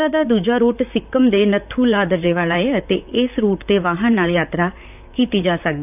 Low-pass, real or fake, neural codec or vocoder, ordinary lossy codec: 3.6 kHz; fake; vocoder, 44.1 kHz, 128 mel bands, Pupu-Vocoder; none